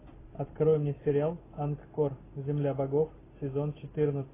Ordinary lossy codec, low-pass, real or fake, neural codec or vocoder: AAC, 16 kbps; 3.6 kHz; real; none